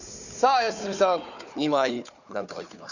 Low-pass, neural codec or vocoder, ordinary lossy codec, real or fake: 7.2 kHz; codec, 16 kHz, 4 kbps, FunCodec, trained on Chinese and English, 50 frames a second; none; fake